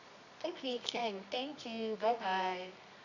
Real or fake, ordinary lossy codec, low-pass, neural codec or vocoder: fake; none; 7.2 kHz; codec, 24 kHz, 0.9 kbps, WavTokenizer, medium music audio release